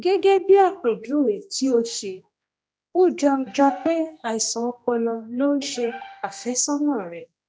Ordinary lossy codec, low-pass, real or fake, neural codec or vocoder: none; none; fake; codec, 16 kHz, 1 kbps, X-Codec, HuBERT features, trained on general audio